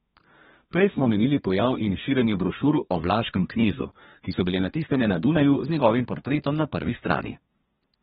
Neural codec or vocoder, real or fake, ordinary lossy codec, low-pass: codec, 32 kHz, 1.9 kbps, SNAC; fake; AAC, 16 kbps; 14.4 kHz